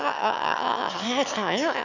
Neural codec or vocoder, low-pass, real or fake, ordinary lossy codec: autoencoder, 22.05 kHz, a latent of 192 numbers a frame, VITS, trained on one speaker; 7.2 kHz; fake; none